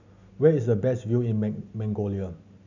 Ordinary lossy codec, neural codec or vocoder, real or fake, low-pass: none; none; real; 7.2 kHz